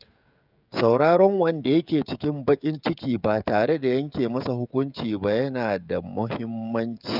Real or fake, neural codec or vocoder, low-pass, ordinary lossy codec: fake; codec, 16 kHz, 16 kbps, FreqCodec, smaller model; 5.4 kHz; none